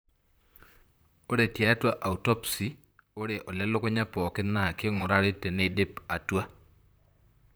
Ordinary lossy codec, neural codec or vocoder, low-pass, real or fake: none; vocoder, 44.1 kHz, 128 mel bands, Pupu-Vocoder; none; fake